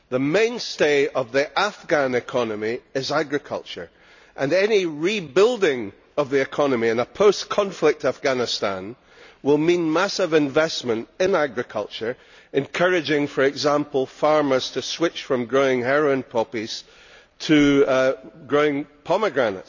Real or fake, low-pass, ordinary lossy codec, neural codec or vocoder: real; 7.2 kHz; none; none